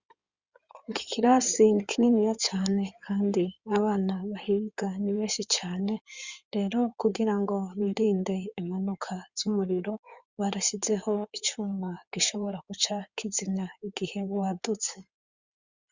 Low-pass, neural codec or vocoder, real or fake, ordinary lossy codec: 7.2 kHz; codec, 16 kHz in and 24 kHz out, 2.2 kbps, FireRedTTS-2 codec; fake; Opus, 64 kbps